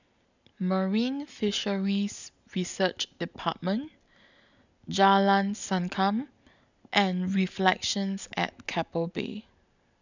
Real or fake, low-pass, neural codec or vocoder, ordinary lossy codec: real; 7.2 kHz; none; none